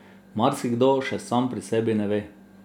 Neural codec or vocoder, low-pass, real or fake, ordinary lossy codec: none; 19.8 kHz; real; none